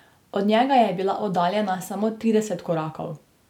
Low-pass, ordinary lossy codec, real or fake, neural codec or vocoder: 19.8 kHz; none; real; none